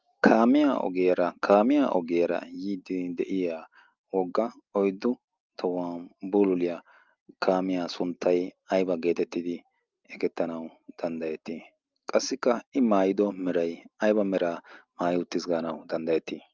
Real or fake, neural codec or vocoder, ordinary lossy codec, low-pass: real; none; Opus, 24 kbps; 7.2 kHz